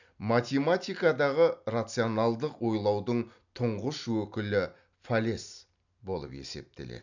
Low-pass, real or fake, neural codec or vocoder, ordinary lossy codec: 7.2 kHz; real; none; none